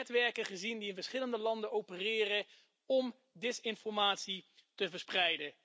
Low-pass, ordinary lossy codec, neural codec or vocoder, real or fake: none; none; none; real